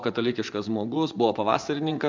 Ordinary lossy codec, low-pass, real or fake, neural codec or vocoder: MP3, 64 kbps; 7.2 kHz; fake; vocoder, 24 kHz, 100 mel bands, Vocos